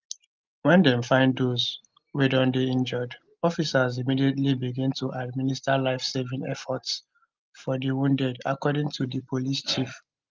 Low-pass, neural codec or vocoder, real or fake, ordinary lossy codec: 7.2 kHz; none; real; Opus, 32 kbps